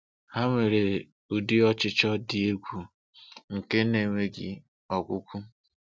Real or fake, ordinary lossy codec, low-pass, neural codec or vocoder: real; none; none; none